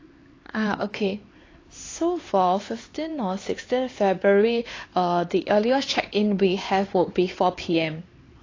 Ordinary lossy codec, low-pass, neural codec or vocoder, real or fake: AAC, 32 kbps; 7.2 kHz; codec, 16 kHz, 4 kbps, X-Codec, HuBERT features, trained on LibriSpeech; fake